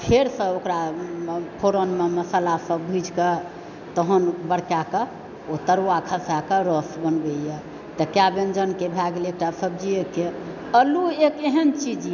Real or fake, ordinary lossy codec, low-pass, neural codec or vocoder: real; none; 7.2 kHz; none